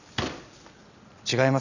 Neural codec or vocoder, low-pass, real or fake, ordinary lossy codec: none; 7.2 kHz; real; none